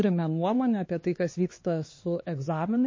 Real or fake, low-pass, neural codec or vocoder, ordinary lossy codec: fake; 7.2 kHz; codec, 16 kHz, 2 kbps, X-Codec, HuBERT features, trained on LibriSpeech; MP3, 32 kbps